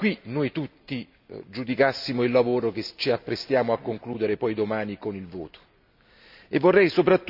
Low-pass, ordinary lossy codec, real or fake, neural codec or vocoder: 5.4 kHz; none; real; none